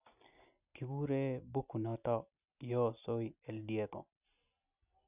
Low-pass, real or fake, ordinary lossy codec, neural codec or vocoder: 3.6 kHz; real; none; none